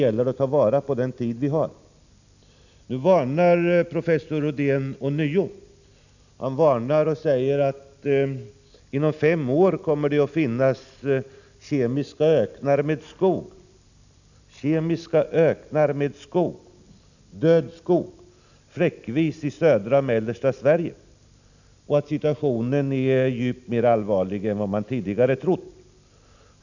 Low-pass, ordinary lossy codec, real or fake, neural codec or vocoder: 7.2 kHz; none; real; none